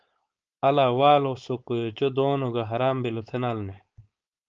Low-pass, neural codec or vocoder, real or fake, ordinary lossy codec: 7.2 kHz; codec, 16 kHz, 4.8 kbps, FACodec; fake; Opus, 24 kbps